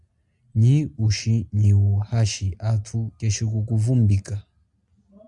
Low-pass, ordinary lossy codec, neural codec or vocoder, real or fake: 10.8 kHz; MP3, 96 kbps; none; real